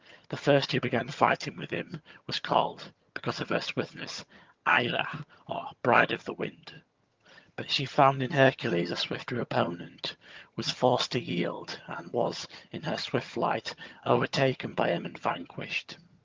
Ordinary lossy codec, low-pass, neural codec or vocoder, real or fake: Opus, 32 kbps; 7.2 kHz; vocoder, 22.05 kHz, 80 mel bands, HiFi-GAN; fake